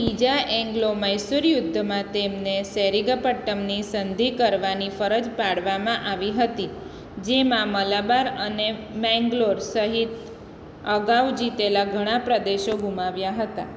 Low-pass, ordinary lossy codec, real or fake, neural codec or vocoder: none; none; real; none